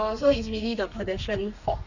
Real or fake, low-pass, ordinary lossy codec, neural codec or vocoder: fake; 7.2 kHz; none; codec, 32 kHz, 1.9 kbps, SNAC